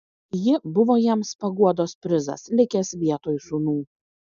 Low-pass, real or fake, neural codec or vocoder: 7.2 kHz; real; none